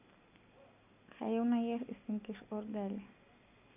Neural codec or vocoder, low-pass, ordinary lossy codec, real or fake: none; 3.6 kHz; none; real